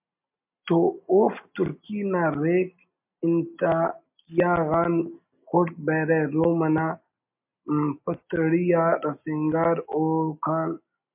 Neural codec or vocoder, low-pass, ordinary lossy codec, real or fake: none; 3.6 kHz; MP3, 32 kbps; real